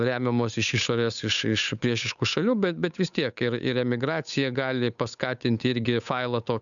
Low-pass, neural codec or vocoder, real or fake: 7.2 kHz; codec, 16 kHz, 8 kbps, FunCodec, trained on Chinese and English, 25 frames a second; fake